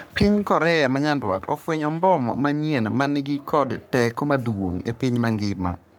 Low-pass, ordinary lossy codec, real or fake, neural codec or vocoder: none; none; fake; codec, 44.1 kHz, 3.4 kbps, Pupu-Codec